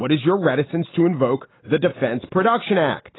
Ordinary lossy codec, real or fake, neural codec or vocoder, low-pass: AAC, 16 kbps; real; none; 7.2 kHz